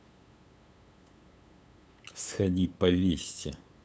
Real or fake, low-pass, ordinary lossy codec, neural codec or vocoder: fake; none; none; codec, 16 kHz, 8 kbps, FunCodec, trained on LibriTTS, 25 frames a second